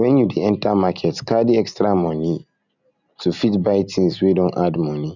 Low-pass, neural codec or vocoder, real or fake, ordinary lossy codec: 7.2 kHz; none; real; none